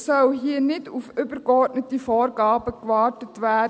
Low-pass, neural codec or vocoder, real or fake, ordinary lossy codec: none; none; real; none